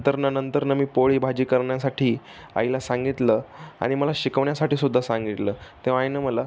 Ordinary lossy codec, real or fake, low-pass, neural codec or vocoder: none; real; none; none